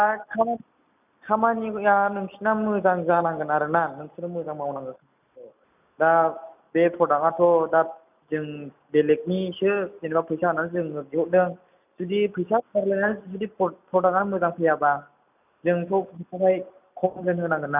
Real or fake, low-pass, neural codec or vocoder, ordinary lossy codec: real; 3.6 kHz; none; none